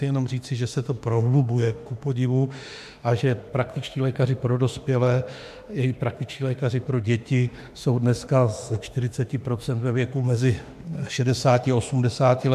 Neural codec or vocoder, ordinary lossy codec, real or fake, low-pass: autoencoder, 48 kHz, 32 numbers a frame, DAC-VAE, trained on Japanese speech; AAC, 96 kbps; fake; 14.4 kHz